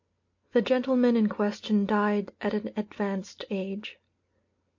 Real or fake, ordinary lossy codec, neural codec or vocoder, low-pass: real; MP3, 48 kbps; none; 7.2 kHz